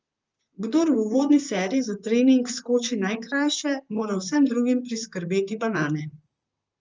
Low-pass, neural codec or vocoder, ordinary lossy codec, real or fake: 7.2 kHz; vocoder, 44.1 kHz, 128 mel bands, Pupu-Vocoder; Opus, 24 kbps; fake